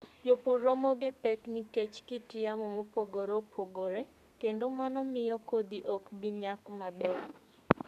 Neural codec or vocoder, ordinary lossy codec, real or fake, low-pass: codec, 32 kHz, 1.9 kbps, SNAC; none; fake; 14.4 kHz